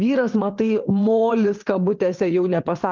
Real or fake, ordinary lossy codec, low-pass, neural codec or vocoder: fake; Opus, 32 kbps; 7.2 kHz; vocoder, 22.05 kHz, 80 mel bands, WaveNeXt